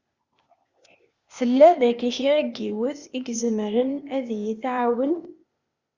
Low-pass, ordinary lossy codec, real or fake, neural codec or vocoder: 7.2 kHz; Opus, 64 kbps; fake; codec, 16 kHz, 0.8 kbps, ZipCodec